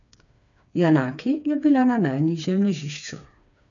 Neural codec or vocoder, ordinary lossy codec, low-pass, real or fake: codec, 16 kHz, 4 kbps, FreqCodec, smaller model; none; 7.2 kHz; fake